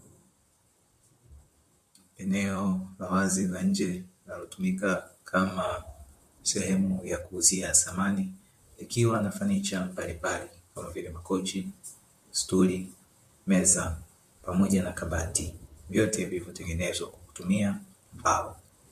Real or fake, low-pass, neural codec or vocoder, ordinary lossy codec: fake; 14.4 kHz; vocoder, 44.1 kHz, 128 mel bands, Pupu-Vocoder; MP3, 64 kbps